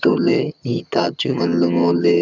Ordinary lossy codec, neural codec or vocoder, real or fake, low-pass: none; vocoder, 22.05 kHz, 80 mel bands, HiFi-GAN; fake; 7.2 kHz